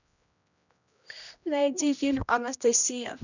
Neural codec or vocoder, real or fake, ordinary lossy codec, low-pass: codec, 16 kHz, 1 kbps, X-Codec, HuBERT features, trained on general audio; fake; none; 7.2 kHz